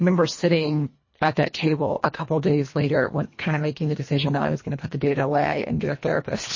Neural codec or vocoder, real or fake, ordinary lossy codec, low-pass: codec, 24 kHz, 1.5 kbps, HILCodec; fake; MP3, 32 kbps; 7.2 kHz